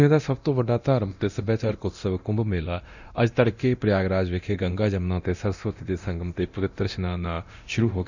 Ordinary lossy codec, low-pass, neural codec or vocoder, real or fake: none; 7.2 kHz; codec, 24 kHz, 0.9 kbps, DualCodec; fake